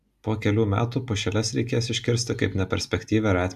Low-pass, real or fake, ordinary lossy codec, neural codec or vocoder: 14.4 kHz; real; AAC, 96 kbps; none